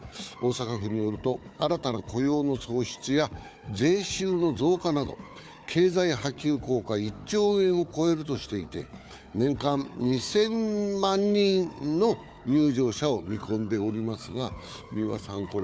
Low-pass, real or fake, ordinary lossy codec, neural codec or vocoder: none; fake; none; codec, 16 kHz, 4 kbps, FunCodec, trained on Chinese and English, 50 frames a second